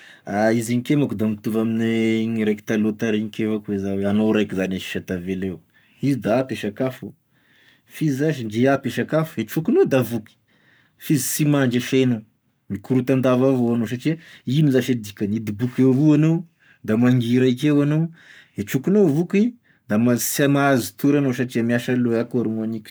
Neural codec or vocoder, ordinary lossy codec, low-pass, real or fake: codec, 44.1 kHz, 7.8 kbps, Pupu-Codec; none; none; fake